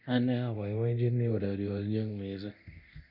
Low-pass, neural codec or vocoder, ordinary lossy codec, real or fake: 5.4 kHz; codec, 24 kHz, 0.9 kbps, DualCodec; none; fake